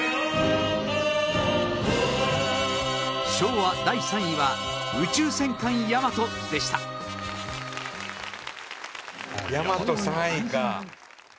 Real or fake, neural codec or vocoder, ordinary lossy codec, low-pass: real; none; none; none